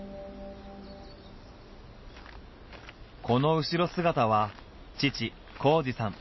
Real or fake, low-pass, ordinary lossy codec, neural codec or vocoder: real; 7.2 kHz; MP3, 24 kbps; none